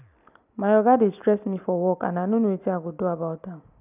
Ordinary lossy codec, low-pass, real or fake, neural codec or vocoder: none; 3.6 kHz; real; none